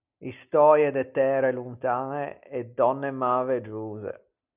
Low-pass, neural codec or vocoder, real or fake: 3.6 kHz; none; real